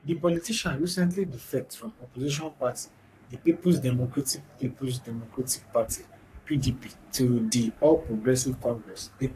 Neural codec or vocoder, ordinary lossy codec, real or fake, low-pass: codec, 44.1 kHz, 3.4 kbps, Pupu-Codec; AAC, 64 kbps; fake; 14.4 kHz